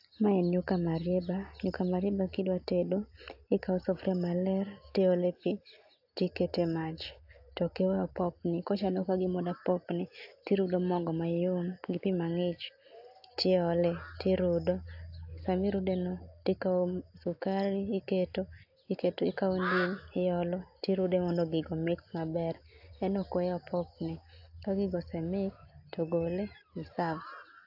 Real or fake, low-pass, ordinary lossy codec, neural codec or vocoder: real; 5.4 kHz; AAC, 48 kbps; none